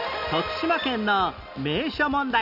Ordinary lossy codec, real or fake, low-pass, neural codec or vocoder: none; real; 5.4 kHz; none